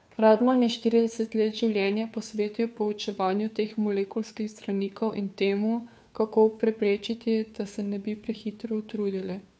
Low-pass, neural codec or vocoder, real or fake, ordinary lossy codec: none; codec, 16 kHz, 2 kbps, FunCodec, trained on Chinese and English, 25 frames a second; fake; none